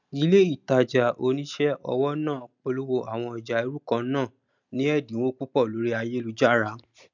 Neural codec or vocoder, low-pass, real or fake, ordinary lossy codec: none; 7.2 kHz; real; none